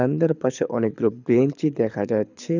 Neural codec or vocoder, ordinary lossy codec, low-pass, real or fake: codec, 24 kHz, 6 kbps, HILCodec; none; 7.2 kHz; fake